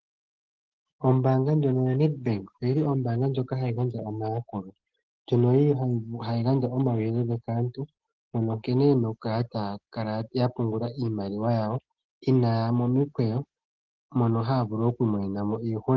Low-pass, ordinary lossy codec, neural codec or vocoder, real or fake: 7.2 kHz; Opus, 16 kbps; none; real